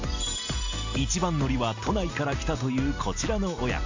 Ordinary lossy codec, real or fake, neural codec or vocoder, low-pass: AAC, 48 kbps; real; none; 7.2 kHz